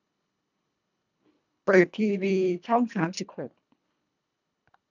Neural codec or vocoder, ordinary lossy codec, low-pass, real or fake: codec, 24 kHz, 1.5 kbps, HILCodec; none; 7.2 kHz; fake